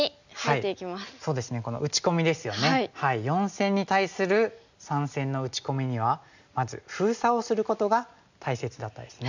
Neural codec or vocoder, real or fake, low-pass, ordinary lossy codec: none; real; 7.2 kHz; none